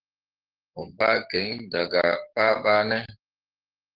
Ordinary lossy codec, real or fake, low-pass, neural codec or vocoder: Opus, 16 kbps; fake; 5.4 kHz; codec, 44.1 kHz, 7.8 kbps, DAC